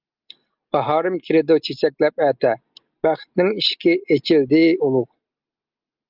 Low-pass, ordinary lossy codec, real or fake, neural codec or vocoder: 5.4 kHz; Opus, 24 kbps; real; none